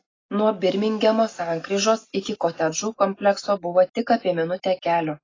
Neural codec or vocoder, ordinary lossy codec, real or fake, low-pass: none; AAC, 32 kbps; real; 7.2 kHz